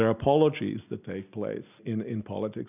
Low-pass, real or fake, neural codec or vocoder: 3.6 kHz; real; none